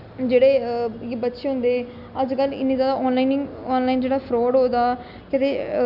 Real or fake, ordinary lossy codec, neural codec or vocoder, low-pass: real; none; none; 5.4 kHz